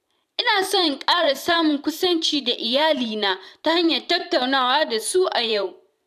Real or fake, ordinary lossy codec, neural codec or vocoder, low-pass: fake; none; vocoder, 44.1 kHz, 128 mel bands every 512 samples, BigVGAN v2; 14.4 kHz